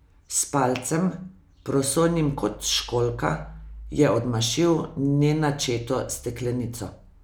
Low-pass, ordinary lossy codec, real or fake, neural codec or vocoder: none; none; real; none